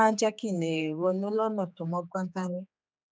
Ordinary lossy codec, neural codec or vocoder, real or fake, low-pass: none; codec, 16 kHz, 4 kbps, X-Codec, HuBERT features, trained on general audio; fake; none